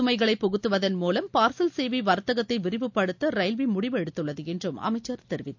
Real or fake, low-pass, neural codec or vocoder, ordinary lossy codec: real; 7.2 kHz; none; MP3, 64 kbps